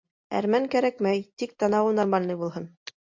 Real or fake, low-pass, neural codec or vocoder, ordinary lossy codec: real; 7.2 kHz; none; MP3, 48 kbps